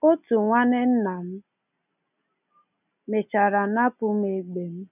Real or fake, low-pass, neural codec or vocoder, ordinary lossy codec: real; 3.6 kHz; none; none